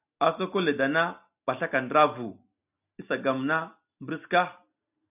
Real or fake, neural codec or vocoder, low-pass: real; none; 3.6 kHz